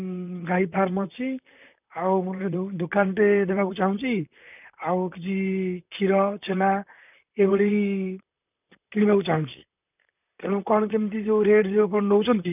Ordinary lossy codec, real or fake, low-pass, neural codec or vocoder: none; fake; 3.6 kHz; vocoder, 44.1 kHz, 128 mel bands, Pupu-Vocoder